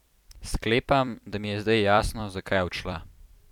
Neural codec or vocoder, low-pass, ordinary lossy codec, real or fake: vocoder, 44.1 kHz, 128 mel bands every 256 samples, BigVGAN v2; 19.8 kHz; none; fake